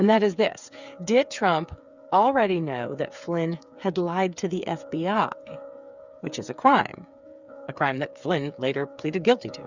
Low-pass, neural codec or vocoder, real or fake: 7.2 kHz; codec, 16 kHz, 8 kbps, FreqCodec, smaller model; fake